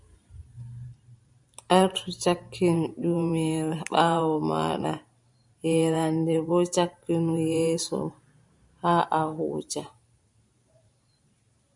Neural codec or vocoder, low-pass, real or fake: vocoder, 44.1 kHz, 128 mel bands every 512 samples, BigVGAN v2; 10.8 kHz; fake